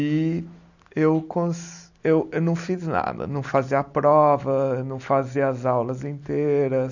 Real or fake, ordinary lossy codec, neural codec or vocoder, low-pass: real; none; none; 7.2 kHz